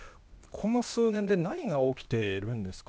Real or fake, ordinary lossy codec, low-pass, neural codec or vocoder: fake; none; none; codec, 16 kHz, 0.8 kbps, ZipCodec